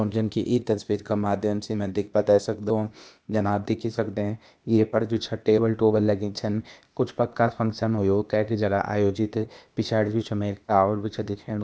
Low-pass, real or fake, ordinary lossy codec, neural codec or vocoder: none; fake; none; codec, 16 kHz, 0.8 kbps, ZipCodec